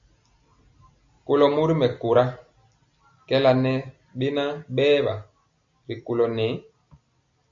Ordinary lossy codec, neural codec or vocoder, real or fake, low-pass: AAC, 64 kbps; none; real; 7.2 kHz